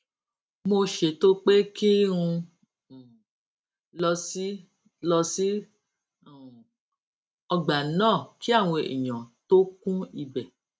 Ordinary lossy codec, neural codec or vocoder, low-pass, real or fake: none; none; none; real